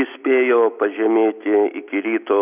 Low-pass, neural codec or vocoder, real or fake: 3.6 kHz; none; real